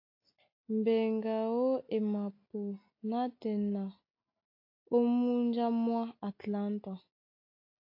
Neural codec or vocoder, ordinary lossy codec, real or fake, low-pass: none; AAC, 32 kbps; real; 5.4 kHz